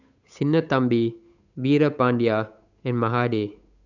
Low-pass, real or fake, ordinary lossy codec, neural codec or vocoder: 7.2 kHz; fake; none; codec, 16 kHz, 8 kbps, FunCodec, trained on Chinese and English, 25 frames a second